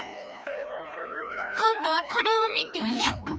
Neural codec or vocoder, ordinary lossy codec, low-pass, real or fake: codec, 16 kHz, 1 kbps, FreqCodec, larger model; none; none; fake